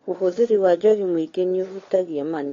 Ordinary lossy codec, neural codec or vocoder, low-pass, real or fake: AAC, 32 kbps; codec, 16 kHz, 4 kbps, FunCodec, trained on LibriTTS, 50 frames a second; 7.2 kHz; fake